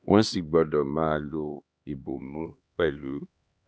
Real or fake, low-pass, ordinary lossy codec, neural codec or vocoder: fake; none; none; codec, 16 kHz, 2 kbps, X-Codec, HuBERT features, trained on LibriSpeech